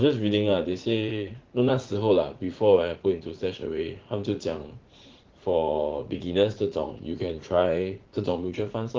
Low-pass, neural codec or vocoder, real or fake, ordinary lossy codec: 7.2 kHz; vocoder, 44.1 kHz, 80 mel bands, Vocos; fake; Opus, 16 kbps